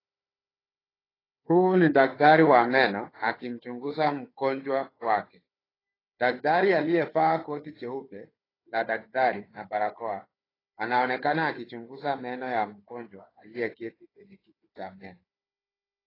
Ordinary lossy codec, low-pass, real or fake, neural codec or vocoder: AAC, 24 kbps; 5.4 kHz; fake; codec, 16 kHz, 4 kbps, FunCodec, trained on Chinese and English, 50 frames a second